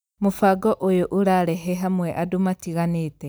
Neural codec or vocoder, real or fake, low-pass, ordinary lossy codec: none; real; none; none